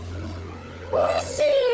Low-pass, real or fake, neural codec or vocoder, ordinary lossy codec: none; fake; codec, 16 kHz, 16 kbps, FunCodec, trained on LibriTTS, 50 frames a second; none